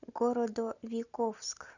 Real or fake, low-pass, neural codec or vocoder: real; 7.2 kHz; none